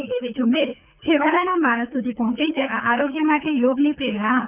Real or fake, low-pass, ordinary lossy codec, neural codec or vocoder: fake; 3.6 kHz; none; codec, 24 kHz, 6 kbps, HILCodec